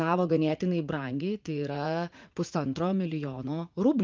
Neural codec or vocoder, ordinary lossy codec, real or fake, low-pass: vocoder, 44.1 kHz, 80 mel bands, Vocos; Opus, 32 kbps; fake; 7.2 kHz